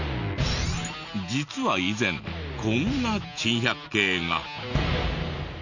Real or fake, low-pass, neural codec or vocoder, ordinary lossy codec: real; 7.2 kHz; none; none